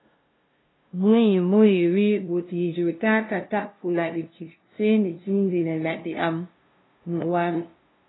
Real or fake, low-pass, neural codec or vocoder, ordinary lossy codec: fake; 7.2 kHz; codec, 16 kHz, 0.5 kbps, FunCodec, trained on LibriTTS, 25 frames a second; AAC, 16 kbps